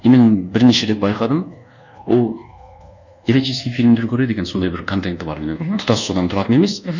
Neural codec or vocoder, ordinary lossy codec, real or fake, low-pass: codec, 24 kHz, 1.2 kbps, DualCodec; none; fake; 7.2 kHz